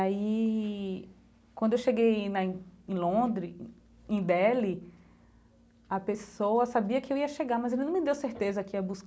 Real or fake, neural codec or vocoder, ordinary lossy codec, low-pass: real; none; none; none